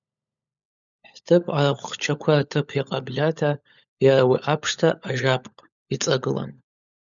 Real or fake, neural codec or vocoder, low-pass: fake; codec, 16 kHz, 16 kbps, FunCodec, trained on LibriTTS, 50 frames a second; 7.2 kHz